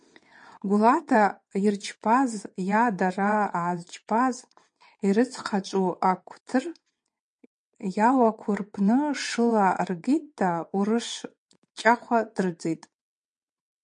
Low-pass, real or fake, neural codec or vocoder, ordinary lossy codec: 9.9 kHz; fake; vocoder, 22.05 kHz, 80 mel bands, WaveNeXt; MP3, 48 kbps